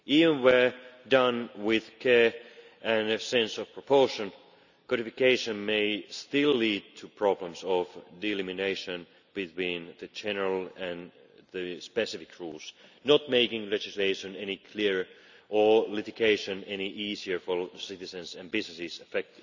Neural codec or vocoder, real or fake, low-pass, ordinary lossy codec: none; real; 7.2 kHz; none